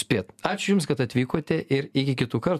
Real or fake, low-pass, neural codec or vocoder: real; 14.4 kHz; none